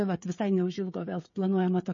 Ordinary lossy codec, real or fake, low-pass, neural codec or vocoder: MP3, 32 kbps; fake; 7.2 kHz; codec, 16 kHz, 16 kbps, FreqCodec, smaller model